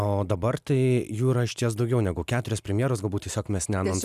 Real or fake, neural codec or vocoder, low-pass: real; none; 14.4 kHz